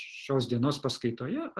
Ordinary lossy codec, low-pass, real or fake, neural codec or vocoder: Opus, 16 kbps; 10.8 kHz; real; none